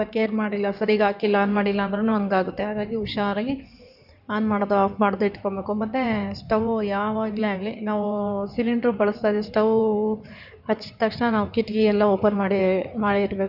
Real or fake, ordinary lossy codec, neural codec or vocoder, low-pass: fake; none; codec, 16 kHz in and 24 kHz out, 2.2 kbps, FireRedTTS-2 codec; 5.4 kHz